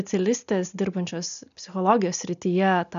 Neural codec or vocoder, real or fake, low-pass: none; real; 7.2 kHz